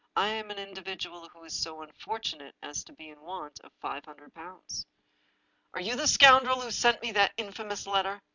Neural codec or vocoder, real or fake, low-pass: none; real; 7.2 kHz